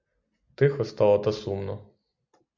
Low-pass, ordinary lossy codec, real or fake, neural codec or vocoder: 7.2 kHz; MP3, 64 kbps; real; none